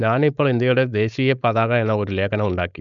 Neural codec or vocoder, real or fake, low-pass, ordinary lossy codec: codec, 16 kHz, 4.8 kbps, FACodec; fake; 7.2 kHz; none